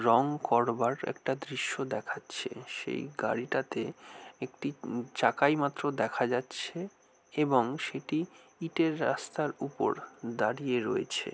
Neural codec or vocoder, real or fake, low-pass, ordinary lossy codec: none; real; none; none